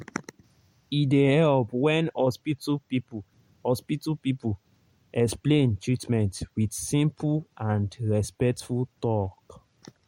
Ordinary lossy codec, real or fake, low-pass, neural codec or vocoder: MP3, 64 kbps; real; 19.8 kHz; none